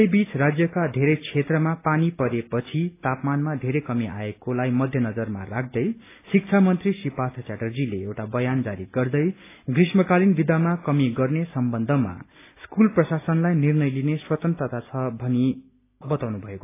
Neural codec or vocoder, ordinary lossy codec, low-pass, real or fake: none; AAC, 24 kbps; 3.6 kHz; real